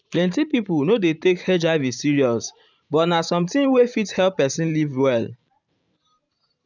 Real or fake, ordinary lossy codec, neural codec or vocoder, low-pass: fake; none; vocoder, 44.1 kHz, 128 mel bands every 512 samples, BigVGAN v2; 7.2 kHz